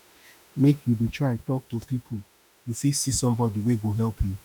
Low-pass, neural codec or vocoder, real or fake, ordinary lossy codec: none; autoencoder, 48 kHz, 32 numbers a frame, DAC-VAE, trained on Japanese speech; fake; none